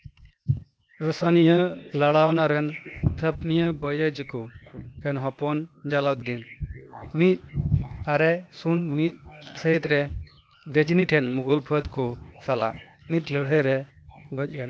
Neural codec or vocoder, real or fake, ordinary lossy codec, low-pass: codec, 16 kHz, 0.8 kbps, ZipCodec; fake; none; none